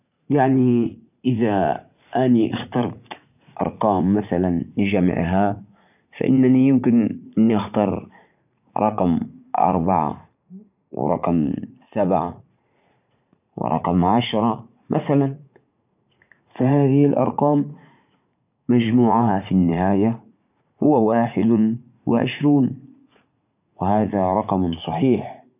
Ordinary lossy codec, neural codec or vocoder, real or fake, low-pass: none; vocoder, 44.1 kHz, 80 mel bands, Vocos; fake; 3.6 kHz